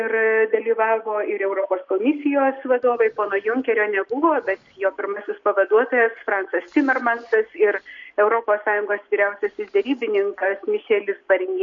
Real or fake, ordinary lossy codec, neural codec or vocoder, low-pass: real; MP3, 48 kbps; none; 7.2 kHz